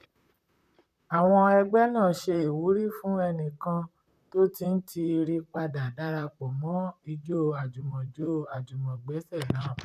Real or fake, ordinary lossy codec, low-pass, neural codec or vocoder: fake; none; 14.4 kHz; vocoder, 44.1 kHz, 128 mel bands, Pupu-Vocoder